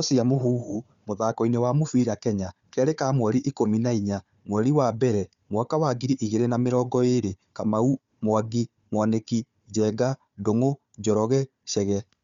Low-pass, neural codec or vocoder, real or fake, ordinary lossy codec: 7.2 kHz; codec, 16 kHz, 4 kbps, FunCodec, trained on Chinese and English, 50 frames a second; fake; Opus, 64 kbps